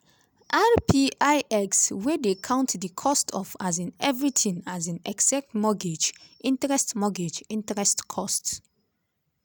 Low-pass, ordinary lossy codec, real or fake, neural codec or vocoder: none; none; real; none